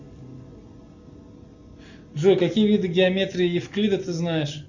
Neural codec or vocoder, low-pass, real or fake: none; 7.2 kHz; real